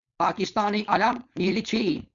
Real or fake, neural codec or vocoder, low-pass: fake; codec, 16 kHz, 4.8 kbps, FACodec; 7.2 kHz